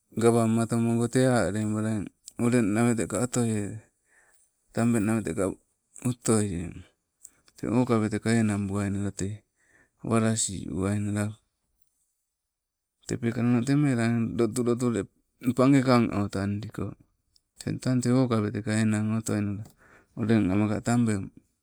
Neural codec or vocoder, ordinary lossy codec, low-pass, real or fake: none; none; none; real